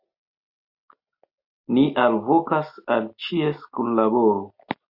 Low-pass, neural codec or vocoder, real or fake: 5.4 kHz; none; real